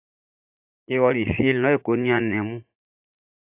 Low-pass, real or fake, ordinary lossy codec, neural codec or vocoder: 3.6 kHz; fake; AAC, 24 kbps; vocoder, 44.1 kHz, 80 mel bands, Vocos